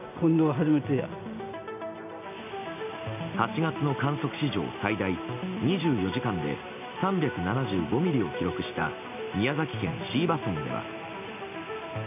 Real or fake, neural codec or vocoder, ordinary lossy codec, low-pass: real; none; none; 3.6 kHz